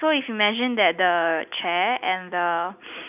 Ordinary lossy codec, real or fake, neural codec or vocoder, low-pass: none; real; none; 3.6 kHz